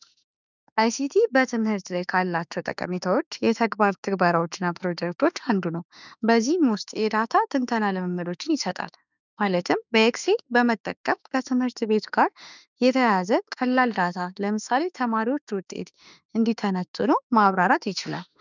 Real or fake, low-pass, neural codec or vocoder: fake; 7.2 kHz; autoencoder, 48 kHz, 32 numbers a frame, DAC-VAE, trained on Japanese speech